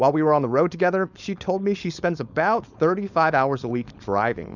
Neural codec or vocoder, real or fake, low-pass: codec, 16 kHz, 4.8 kbps, FACodec; fake; 7.2 kHz